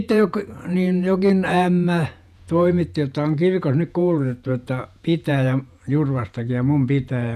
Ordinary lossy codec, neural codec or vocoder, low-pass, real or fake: none; vocoder, 48 kHz, 128 mel bands, Vocos; 14.4 kHz; fake